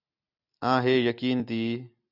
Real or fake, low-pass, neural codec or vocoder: real; 5.4 kHz; none